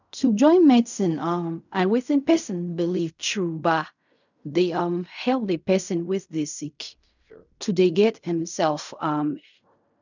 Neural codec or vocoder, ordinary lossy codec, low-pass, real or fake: codec, 16 kHz in and 24 kHz out, 0.4 kbps, LongCat-Audio-Codec, fine tuned four codebook decoder; none; 7.2 kHz; fake